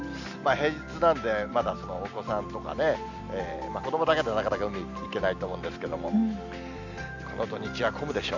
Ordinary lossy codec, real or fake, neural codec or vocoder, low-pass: none; real; none; 7.2 kHz